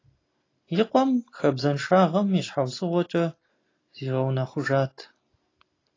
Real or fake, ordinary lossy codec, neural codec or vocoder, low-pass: real; AAC, 32 kbps; none; 7.2 kHz